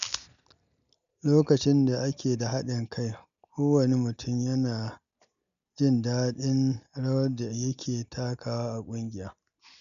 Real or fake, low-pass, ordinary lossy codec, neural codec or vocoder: real; 7.2 kHz; none; none